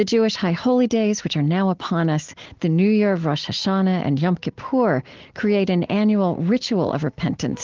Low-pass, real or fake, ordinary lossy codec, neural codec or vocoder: 7.2 kHz; real; Opus, 16 kbps; none